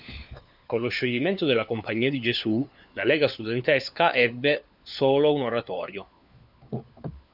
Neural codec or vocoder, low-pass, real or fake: codec, 16 kHz, 4 kbps, FunCodec, trained on LibriTTS, 50 frames a second; 5.4 kHz; fake